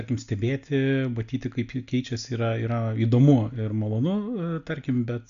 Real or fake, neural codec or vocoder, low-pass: real; none; 7.2 kHz